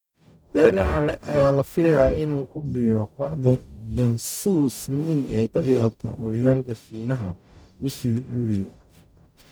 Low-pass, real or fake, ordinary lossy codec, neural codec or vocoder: none; fake; none; codec, 44.1 kHz, 0.9 kbps, DAC